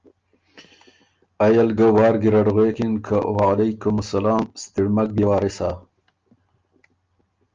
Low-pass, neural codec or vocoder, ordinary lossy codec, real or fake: 7.2 kHz; none; Opus, 24 kbps; real